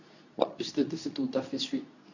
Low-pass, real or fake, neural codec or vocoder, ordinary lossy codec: 7.2 kHz; fake; codec, 24 kHz, 0.9 kbps, WavTokenizer, medium speech release version 2; none